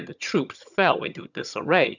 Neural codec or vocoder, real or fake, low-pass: vocoder, 22.05 kHz, 80 mel bands, HiFi-GAN; fake; 7.2 kHz